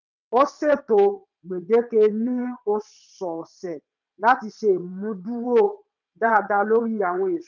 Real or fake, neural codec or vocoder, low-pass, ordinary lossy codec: fake; vocoder, 22.05 kHz, 80 mel bands, WaveNeXt; 7.2 kHz; none